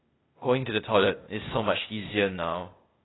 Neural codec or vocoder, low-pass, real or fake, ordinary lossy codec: codec, 16 kHz, 0.8 kbps, ZipCodec; 7.2 kHz; fake; AAC, 16 kbps